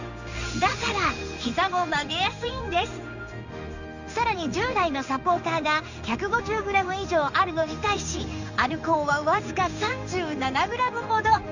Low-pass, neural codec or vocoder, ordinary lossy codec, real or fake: 7.2 kHz; codec, 16 kHz in and 24 kHz out, 1 kbps, XY-Tokenizer; none; fake